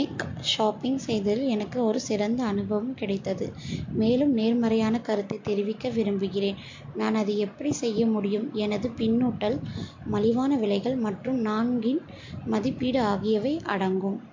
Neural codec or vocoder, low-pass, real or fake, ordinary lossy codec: none; 7.2 kHz; real; MP3, 48 kbps